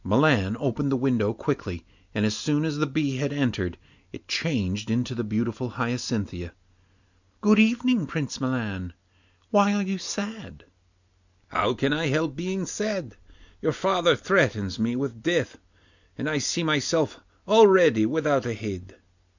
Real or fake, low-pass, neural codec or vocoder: real; 7.2 kHz; none